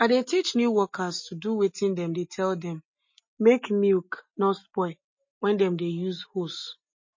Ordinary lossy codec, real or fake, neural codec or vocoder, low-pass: MP3, 32 kbps; real; none; 7.2 kHz